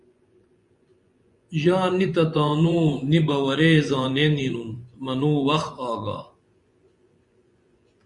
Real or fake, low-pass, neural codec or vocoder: fake; 10.8 kHz; vocoder, 24 kHz, 100 mel bands, Vocos